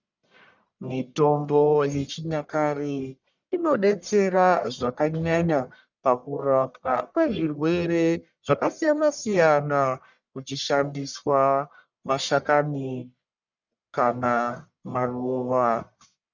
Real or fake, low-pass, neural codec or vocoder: fake; 7.2 kHz; codec, 44.1 kHz, 1.7 kbps, Pupu-Codec